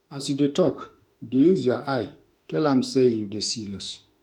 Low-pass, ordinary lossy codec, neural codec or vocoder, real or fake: 19.8 kHz; none; autoencoder, 48 kHz, 32 numbers a frame, DAC-VAE, trained on Japanese speech; fake